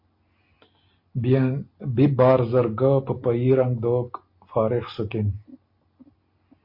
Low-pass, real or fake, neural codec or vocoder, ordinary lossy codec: 5.4 kHz; real; none; MP3, 32 kbps